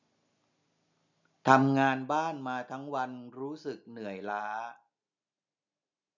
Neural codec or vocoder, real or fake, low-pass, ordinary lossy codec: none; real; 7.2 kHz; none